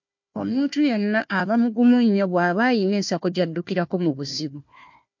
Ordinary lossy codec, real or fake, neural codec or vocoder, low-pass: MP3, 48 kbps; fake; codec, 16 kHz, 1 kbps, FunCodec, trained on Chinese and English, 50 frames a second; 7.2 kHz